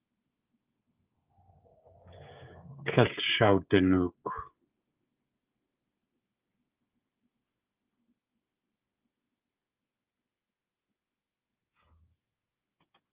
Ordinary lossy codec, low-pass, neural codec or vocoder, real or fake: Opus, 24 kbps; 3.6 kHz; codec, 16 kHz, 16 kbps, FreqCodec, smaller model; fake